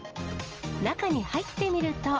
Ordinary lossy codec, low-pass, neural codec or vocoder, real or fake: Opus, 24 kbps; 7.2 kHz; none; real